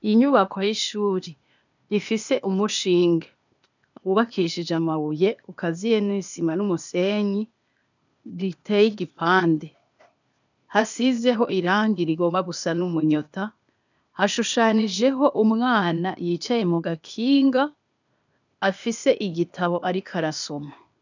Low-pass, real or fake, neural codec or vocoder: 7.2 kHz; fake; codec, 16 kHz, 0.8 kbps, ZipCodec